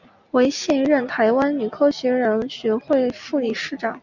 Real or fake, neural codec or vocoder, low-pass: real; none; 7.2 kHz